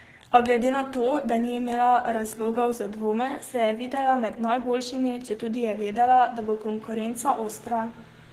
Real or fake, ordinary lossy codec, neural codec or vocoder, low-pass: fake; Opus, 16 kbps; codec, 32 kHz, 1.9 kbps, SNAC; 14.4 kHz